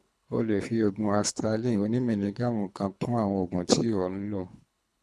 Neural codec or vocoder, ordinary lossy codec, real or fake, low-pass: codec, 24 kHz, 3 kbps, HILCodec; none; fake; none